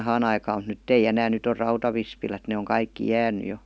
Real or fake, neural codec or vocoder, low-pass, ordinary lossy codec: real; none; none; none